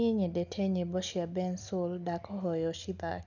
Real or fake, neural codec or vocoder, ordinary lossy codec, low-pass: real; none; none; 7.2 kHz